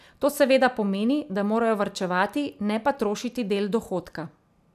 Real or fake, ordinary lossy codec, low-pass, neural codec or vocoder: real; none; 14.4 kHz; none